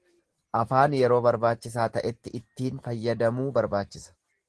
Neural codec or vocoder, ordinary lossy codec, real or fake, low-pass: none; Opus, 16 kbps; real; 10.8 kHz